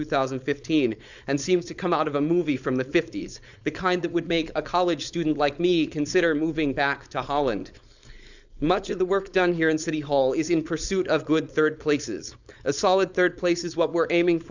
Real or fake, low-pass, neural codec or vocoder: fake; 7.2 kHz; codec, 16 kHz, 4.8 kbps, FACodec